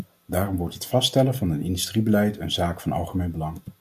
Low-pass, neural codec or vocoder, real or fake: 14.4 kHz; none; real